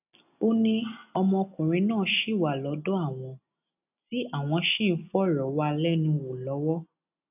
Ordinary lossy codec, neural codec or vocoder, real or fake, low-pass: none; none; real; 3.6 kHz